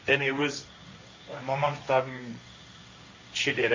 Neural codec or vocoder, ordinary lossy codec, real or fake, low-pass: codec, 16 kHz, 1.1 kbps, Voila-Tokenizer; MP3, 32 kbps; fake; 7.2 kHz